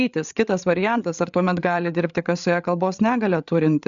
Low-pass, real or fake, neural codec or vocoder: 7.2 kHz; fake; codec, 16 kHz, 8 kbps, FreqCodec, larger model